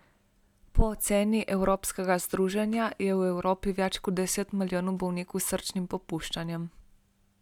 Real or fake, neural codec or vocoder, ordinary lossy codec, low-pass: real; none; none; 19.8 kHz